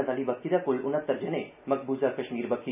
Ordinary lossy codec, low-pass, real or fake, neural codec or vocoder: none; 3.6 kHz; real; none